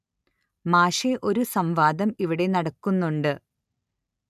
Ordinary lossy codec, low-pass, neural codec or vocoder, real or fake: none; 14.4 kHz; none; real